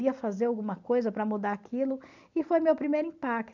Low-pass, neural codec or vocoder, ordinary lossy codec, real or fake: 7.2 kHz; none; none; real